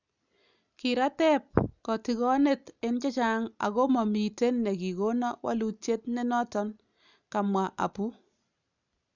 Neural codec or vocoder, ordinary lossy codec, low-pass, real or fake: none; none; 7.2 kHz; real